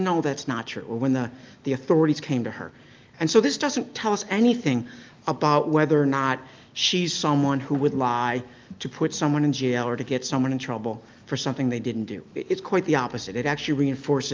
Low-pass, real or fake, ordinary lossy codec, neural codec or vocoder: 7.2 kHz; real; Opus, 24 kbps; none